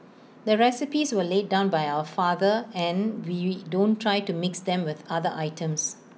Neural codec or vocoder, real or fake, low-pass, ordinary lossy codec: none; real; none; none